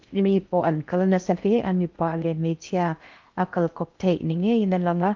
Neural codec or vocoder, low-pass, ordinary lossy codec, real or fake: codec, 16 kHz in and 24 kHz out, 0.6 kbps, FocalCodec, streaming, 4096 codes; 7.2 kHz; Opus, 24 kbps; fake